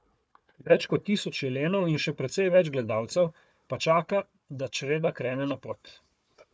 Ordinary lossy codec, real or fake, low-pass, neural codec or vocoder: none; fake; none; codec, 16 kHz, 4 kbps, FunCodec, trained on Chinese and English, 50 frames a second